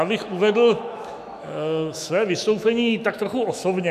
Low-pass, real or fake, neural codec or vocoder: 14.4 kHz; fake; autoencoder, 48 kHz, 128 numbers a frame, DAC-VAE, trained on Japanese speech